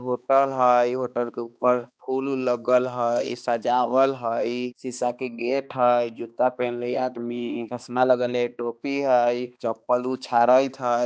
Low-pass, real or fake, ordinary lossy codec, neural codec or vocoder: none; fake; none; codec, 16 kHz, 2 kbps, X-Codec, HuBERT features, trained on balanced general audio